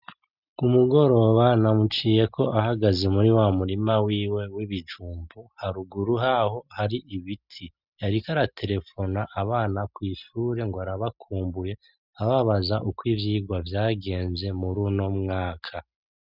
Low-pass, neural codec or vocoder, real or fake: 5.4 kHz; none; real